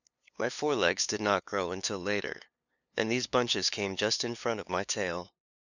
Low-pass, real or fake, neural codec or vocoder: 7.2 kHz; fake; codec, 16 kHz, 2 kbps, FunCodec, trained on LibriTTS, 25 frames a second